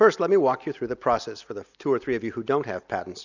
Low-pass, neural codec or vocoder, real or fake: 7.2 kHz; none; real